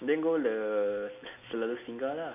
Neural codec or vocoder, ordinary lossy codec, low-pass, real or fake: none; none; 3.6 kHz; real